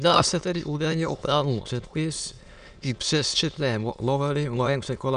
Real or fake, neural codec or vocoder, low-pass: fake; autoencoder, 22.05 kHz, a latent of 192 numbers a frame, VITS, trained on many speakers; 9.9 kHz